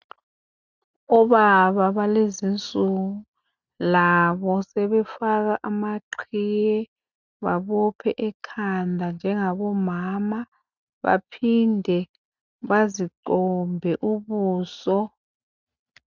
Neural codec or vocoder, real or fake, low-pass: none; real; 7.2 kHz